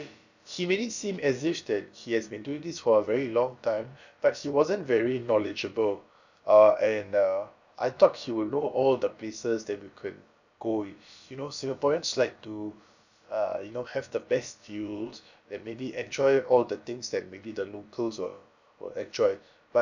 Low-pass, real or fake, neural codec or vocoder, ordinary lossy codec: 7.2 kHz; fake; codec, 16 kHz, about 1 kbps, DyCAST, with the encoder's durations; none